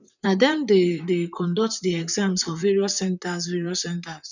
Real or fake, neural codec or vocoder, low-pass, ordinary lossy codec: fake; vocoder, 44.1 kHz, 128 mel bands, Pupu-Vocoder; 7.2 kHz; none